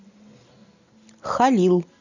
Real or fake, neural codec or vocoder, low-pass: real; none; 7.2 kHz